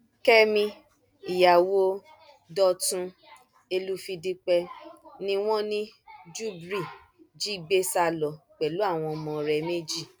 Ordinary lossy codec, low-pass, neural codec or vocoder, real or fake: none; none; none; real